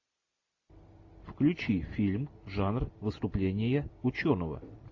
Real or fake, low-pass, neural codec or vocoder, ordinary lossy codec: real; 7.2 kHz; none; AAC, 48 kbps